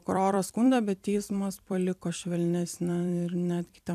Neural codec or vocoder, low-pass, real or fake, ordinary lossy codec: vocoder, 44.1 kHz, 128 mel bands every 256 samples, BigVGAN v2; 14.4 kHz; fake; AAC, 96 kbps